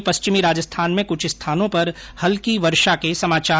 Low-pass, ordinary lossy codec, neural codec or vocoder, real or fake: none; none; none; real